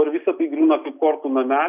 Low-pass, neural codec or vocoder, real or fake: 3.6 kHz; none; real